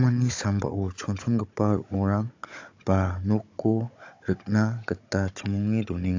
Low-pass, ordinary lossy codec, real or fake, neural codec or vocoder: 7.2 kHz; AAC, 48 kbps; fake; codec, 16 kHz, 16 kbps, FunCodec, trained on Chinese and English, 50 frames a second